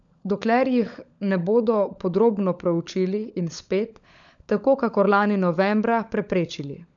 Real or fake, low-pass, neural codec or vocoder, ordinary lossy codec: fake; 7.2 kHz; codec, 16 kHz, 16 kbps, FunCodec, trained on LibriTTS, 50 frames a second; none